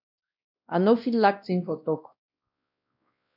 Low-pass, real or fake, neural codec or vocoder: 5.4 kHz; fake; codec, 16 kHz, 1 kbps, X-Codec, WavLM features, trained on Multilingual LibriSpeech